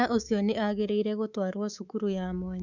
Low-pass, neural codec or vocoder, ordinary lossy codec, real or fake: 7.2 kHz; codec, 24 kHz, 3.1 kbps, DualCodec; none; fake